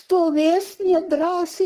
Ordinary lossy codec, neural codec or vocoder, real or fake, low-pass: Opus, 16 kbps; codec, 44.1 kHz, 3.4 kbps, Pupu-Codec; fake; 14.4 kHz